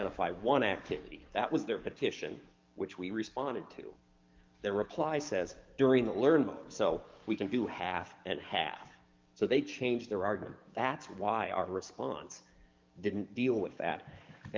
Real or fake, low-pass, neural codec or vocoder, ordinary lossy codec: fake; 7.2 kHz; codec, 44.1 kHz, 7.8 kbps, DAC; Opus, 24 kbps